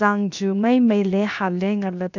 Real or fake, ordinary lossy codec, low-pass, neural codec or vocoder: fake; none; 7.2 kHz; codec, 16 kHz, 0.7 kbps, FocalCodec